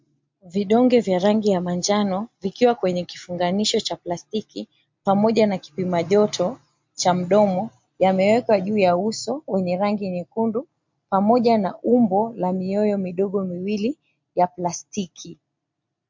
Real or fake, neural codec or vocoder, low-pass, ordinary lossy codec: real; none; 7.2 kHz; MP3, 48 kbps